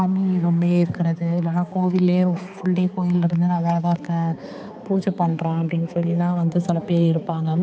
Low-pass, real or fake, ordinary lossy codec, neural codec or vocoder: none; fake; none; codec, 16 kHz, 4 kbps, X-Codec, HuBERT features, trained on balanced general audio